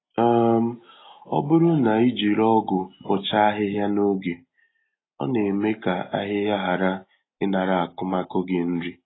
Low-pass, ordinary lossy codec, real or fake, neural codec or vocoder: 7.2 kHz; AAC, 16 kbps; real; none